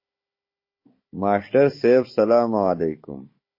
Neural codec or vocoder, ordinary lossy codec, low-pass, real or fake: codec, 16 kHz, 16 kbps, FunCodec, trained on Chinese and English, 50 frames a second; MP3, 24 kbps; 5.4 kHz; fake